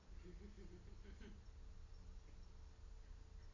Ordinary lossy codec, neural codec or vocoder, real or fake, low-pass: AAC, 32 kbps; none; real; 7.2 kHz